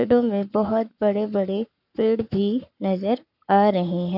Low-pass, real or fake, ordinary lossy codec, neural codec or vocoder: 5.4 kHz; fake; none; codec, 44.1 kHz, 7.8 kbps, Pupu-Codec